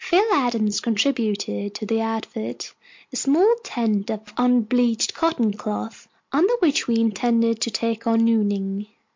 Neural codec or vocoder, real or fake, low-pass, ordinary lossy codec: none; real; 7.2 kHz; MP3, 48 kbps